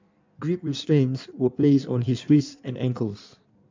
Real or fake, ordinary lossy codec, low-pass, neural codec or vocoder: fake; MP3, 64 kbps; 7.2 kHz; codec, 16 kHz in and 24 kHz out, 1.1 kbps, FireRedTTS-2 codec